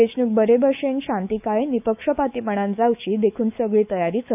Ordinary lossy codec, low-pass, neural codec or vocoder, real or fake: none; 3.6 kHz; autoencoder, 48 kHz, 128 numbers a frame, DAC-VAE, trained on Japanese speech; fake